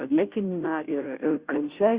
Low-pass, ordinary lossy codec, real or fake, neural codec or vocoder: 3.6 kHz; Opus, 64 kbps; fake; codec, 16 kHz, 0.5 kbps, FunCodec, trained on Chinese and English, 25 frames a second